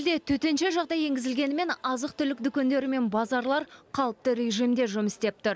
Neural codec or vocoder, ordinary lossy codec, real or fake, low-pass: none; none; real; none